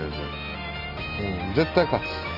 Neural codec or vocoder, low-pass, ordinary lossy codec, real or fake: none; 5.4 kHz; none; real